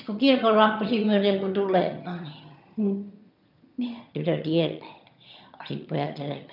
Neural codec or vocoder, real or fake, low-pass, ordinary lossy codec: vocoder, 22.05 kHz, 80 mel bands, HiFi-GAN; fake; 5.4 kHz; none